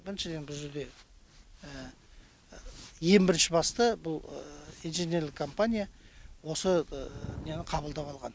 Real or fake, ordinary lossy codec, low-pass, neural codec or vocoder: real; none; none; none